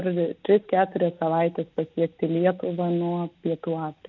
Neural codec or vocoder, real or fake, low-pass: none; real; 7.2 kHz